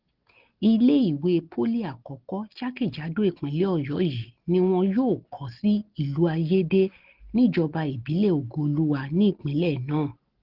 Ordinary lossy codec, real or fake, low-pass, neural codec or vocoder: Opus, 16 kbps; real; 5.4 kHz; none